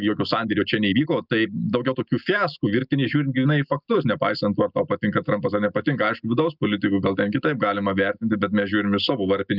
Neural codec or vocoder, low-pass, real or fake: none; 5.4 kHz; real